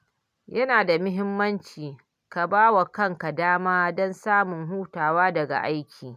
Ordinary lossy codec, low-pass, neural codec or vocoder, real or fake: none; 14.4 kHz; none; real